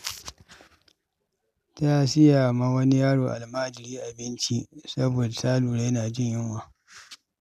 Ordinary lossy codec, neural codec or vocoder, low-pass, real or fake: none; none; 14.4 kHz; real